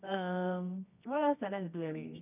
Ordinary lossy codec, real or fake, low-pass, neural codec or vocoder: none; fake; 3.6 kHz; codec, 24 kHz, 0.9 kbps, WavTokenizer, medium music audio release